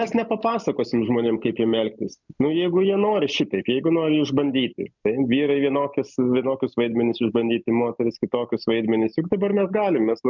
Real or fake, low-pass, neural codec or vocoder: real; 7.2 kHz; none